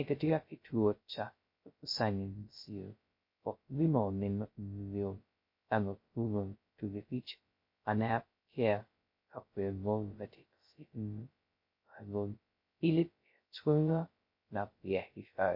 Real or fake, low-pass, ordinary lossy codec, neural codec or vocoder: fake; 5.4 kHz; MP3, 32 kbps; codec, 16 kHz, 0.2 kbps, FocalCodec